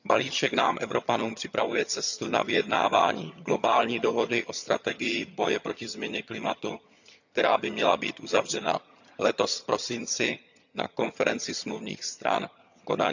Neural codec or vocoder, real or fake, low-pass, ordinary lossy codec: vocoder, 22.05 kHz, 80 mel bands, HiFi-GAN; fake; 7.2 kHz; none